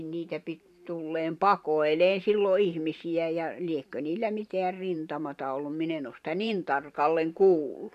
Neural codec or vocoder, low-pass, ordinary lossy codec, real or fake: vocoder, 44.1 kHz, 128 mel bands every 512 samples, BigVGAN v2; 14.4 kHz; none; fake